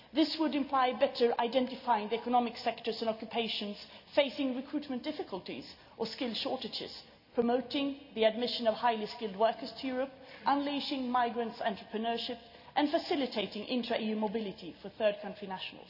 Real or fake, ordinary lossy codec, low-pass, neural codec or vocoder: real; none; 5.4 kHz; none